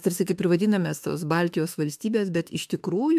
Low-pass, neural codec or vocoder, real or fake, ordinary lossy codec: 14.4 kHz; autoencoder, 48 kHz, 32 numbers a frame, DAC-VAE, trained on Japanese speech; fake; AAC, 96 kbps